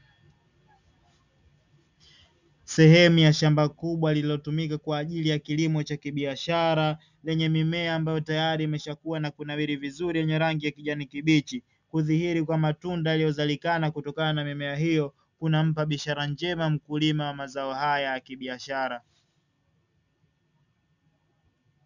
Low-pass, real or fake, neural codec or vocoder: 7.2 kHz; real; none